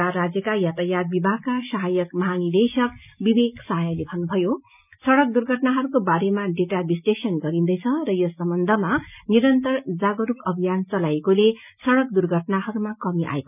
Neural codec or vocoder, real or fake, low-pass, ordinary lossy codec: none; real; 3.6 kHz; none